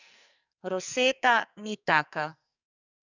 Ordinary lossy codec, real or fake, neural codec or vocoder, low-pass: none; fake; codec, 44.1 kHz, 2.6 kbps, SNAC; 7.2 kHz